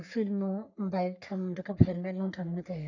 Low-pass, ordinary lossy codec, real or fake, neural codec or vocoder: 7.2 kHz; none; fake; codec, 44.1 kHz, 3.4 kbps, Pupu-Codec